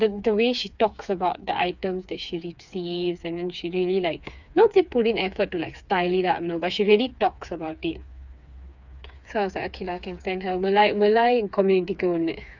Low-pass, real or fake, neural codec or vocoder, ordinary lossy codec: 7.2 kHz; fake; codec, 16 kHz, 4 kbps, FreqCodec, smaller model; none